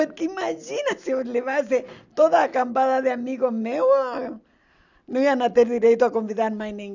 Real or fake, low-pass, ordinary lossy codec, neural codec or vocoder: real; 7.2 kHz; none; none